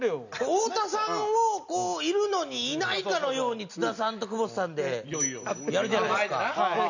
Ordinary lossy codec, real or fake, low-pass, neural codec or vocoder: none; real; 7.2 kHz; none